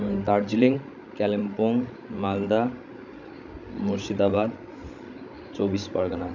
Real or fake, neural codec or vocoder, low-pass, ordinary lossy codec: fake; codec, 16 kHz, 16 kbps, FreqCodec, larger model; 7.2 kHz; none